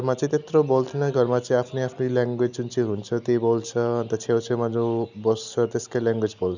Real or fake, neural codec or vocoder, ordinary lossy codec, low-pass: real; none; none; 7.2 kHz